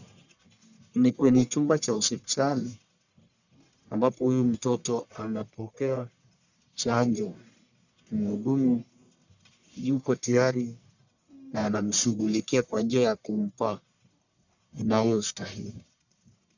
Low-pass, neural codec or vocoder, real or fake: 7.2 kHz; codec, 44.1 kHz, 1.7 kbps, Pupu-Codec; fake